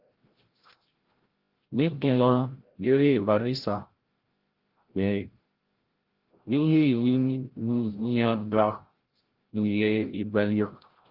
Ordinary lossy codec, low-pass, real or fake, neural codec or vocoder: Opus, 16 kbps; 5.4 kHz; fake; codec, 16 kHz, 0.5 kbps, FreqCodec, larger model